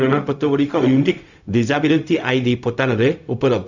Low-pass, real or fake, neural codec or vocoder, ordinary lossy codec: 7.2 kHz; fake; codec, 16 kHz, 0.4 kbps, LongCat-Audio-Codec; none